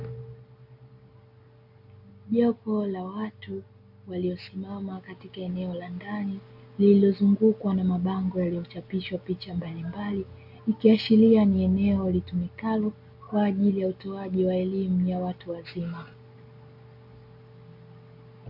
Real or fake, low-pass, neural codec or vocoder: real; 5.4 kHz; none